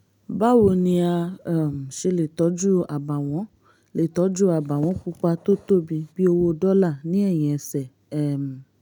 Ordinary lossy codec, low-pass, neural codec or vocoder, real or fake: none; 19.8 kHz; none; real